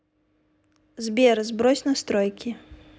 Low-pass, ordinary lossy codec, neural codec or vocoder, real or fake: none; none; none; real